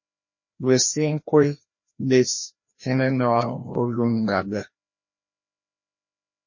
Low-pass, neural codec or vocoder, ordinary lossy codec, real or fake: 7.2 kHz; codec, 16 kHz, 1 kbps, FreqCodec, larger model; MP3, 32 kbps; fake